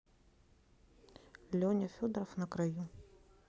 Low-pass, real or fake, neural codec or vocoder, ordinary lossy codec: none; real; none; none